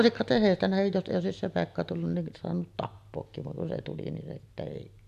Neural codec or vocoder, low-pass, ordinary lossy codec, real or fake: none; 14.4 kHz; none; real